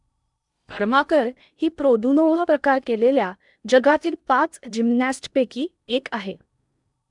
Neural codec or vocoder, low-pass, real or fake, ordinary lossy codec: codec, 16 kHz in and 24 kHz out, 0.8 kbps, FocalCodec, streaming, 65536 codes; 10.8 kHz; fake; none